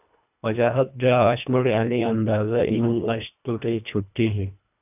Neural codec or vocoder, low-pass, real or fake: codec, 24 kHz, 1.5 kbps, HILCodec; 3.6 kHz; fake